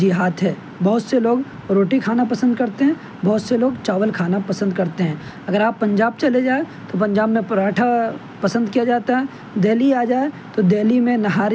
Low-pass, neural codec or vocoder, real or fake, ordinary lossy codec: none; none; real; none